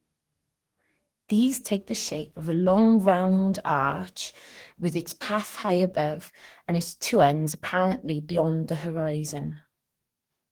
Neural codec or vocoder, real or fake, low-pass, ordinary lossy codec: codec, 44.1 kHz, 2.6 kbps, DAC; fake; 19.8 kHz; Opus, 24 kbps